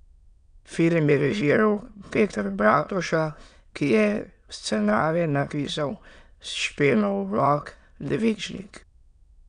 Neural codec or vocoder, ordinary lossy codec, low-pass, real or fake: autoencoder, 22.05 kHz, a latent of 192 numbers a frame, VITS, trained on many speakers; none; 9.9 kHz; fake